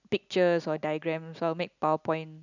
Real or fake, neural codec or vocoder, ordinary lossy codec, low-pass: real; none; none; 7.2 kHz